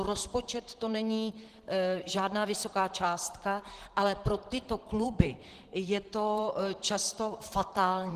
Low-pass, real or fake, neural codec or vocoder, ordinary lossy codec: 14.4 kHz; fake; vocoder, 44.1 kHz, 128 mel bands, Pupu-Vocoder; Opus, 16 kbps